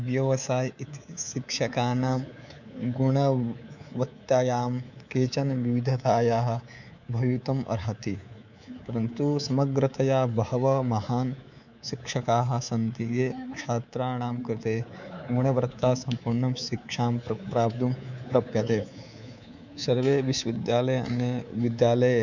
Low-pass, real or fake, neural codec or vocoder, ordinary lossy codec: 7.2 kHz; fake; codec, 24 kHz, 3.1 kbps, DualCodec; none